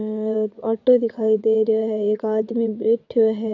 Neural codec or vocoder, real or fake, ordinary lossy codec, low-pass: vocoder, 22.05 kHz, 80 mel bands, WaveNeXt; fake; AAC, 48 kbps; 7.2 kHz